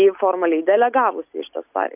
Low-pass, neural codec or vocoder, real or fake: 3.6 kHz; none; real